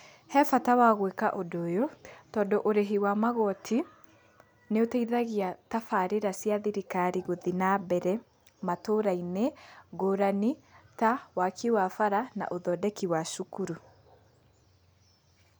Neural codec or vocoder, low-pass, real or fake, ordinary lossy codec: none; none; real; none